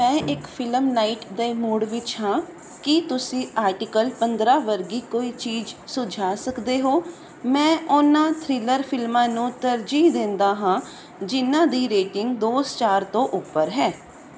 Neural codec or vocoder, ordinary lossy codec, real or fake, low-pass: none; none; real; none